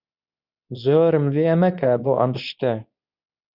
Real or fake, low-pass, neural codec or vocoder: fake; 5.4 kHz; codec, 24 kHz, 0.9 kbps, WavTokenizer, medium speech release version 2